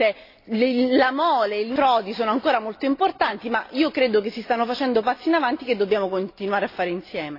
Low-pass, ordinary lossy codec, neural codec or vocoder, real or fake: 5.4 kHz; AAC, 32 kbps; none; real